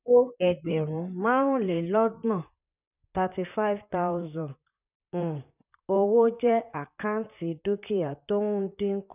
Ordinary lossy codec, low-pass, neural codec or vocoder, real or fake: none; 3.6 kHz; vocoder, 44.1 kHz, 128 mel bands, Pupu-Vocoder; fake